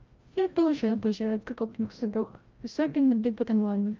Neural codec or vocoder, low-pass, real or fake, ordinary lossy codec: codec, 16 kHz, 0.5 kbps, FreqCodec, larger model; 7.2 kHz; fake; Opus, 32 kbps